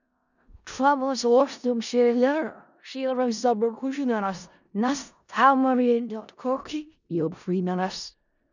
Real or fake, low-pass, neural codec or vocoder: fake; 7.2 kHz; codec, 16 kHz in and 24 kHz out, 0.4 kbps, LongCat-Audio-Codec, four codebook decoder